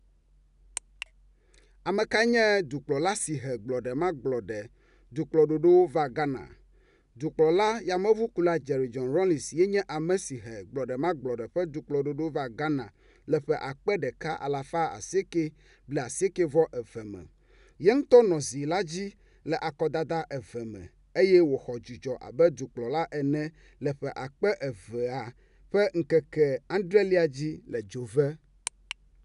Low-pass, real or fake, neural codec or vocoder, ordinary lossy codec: 10.8 kHz; real; none; none